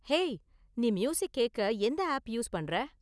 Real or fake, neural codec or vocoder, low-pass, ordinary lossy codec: real; none; none; none